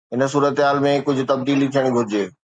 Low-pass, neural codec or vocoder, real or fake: 9.9 kHz; none; real